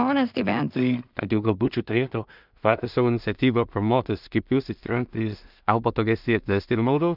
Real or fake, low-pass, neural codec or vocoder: fake; 5.4 kHz; codec, 16 kHz in and 24 kHz out, 0.4 kbps, LongCat-Audio-Codec, two codebook decoder